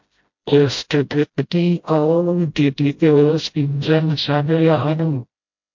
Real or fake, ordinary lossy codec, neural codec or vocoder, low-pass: fake; MP3, 48 kbps; codec, 16 kHz, 0.5 kbps, FreqCodec, smaller model; 7.2 kHz